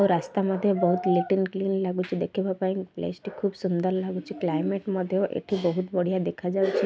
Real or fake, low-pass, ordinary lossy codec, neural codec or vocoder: real; none; none; none